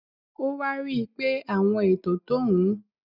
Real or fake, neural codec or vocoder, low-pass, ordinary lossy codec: real; none; 5.4 kHz; none